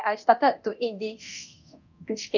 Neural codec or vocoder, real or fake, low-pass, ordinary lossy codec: codec, 24 kHz, 0.9 kbps, DualCodec; fake; 7.2 kHz; none